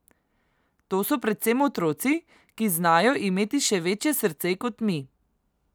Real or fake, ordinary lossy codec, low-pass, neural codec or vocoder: real; none; none; none